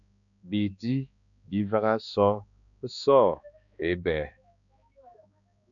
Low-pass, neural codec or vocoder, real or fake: 7.2 kHz; codec, 16 kHz, 2 kbps, X-Codec, HuBERT features, trained on balanced general audio; fake